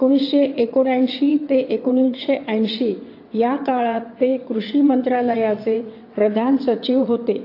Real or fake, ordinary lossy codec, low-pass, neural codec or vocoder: fake; AAC, 32 kbps; 5.4 kHz; vocoder, 22.05 kHz, 80 mel bands, WaveNeXt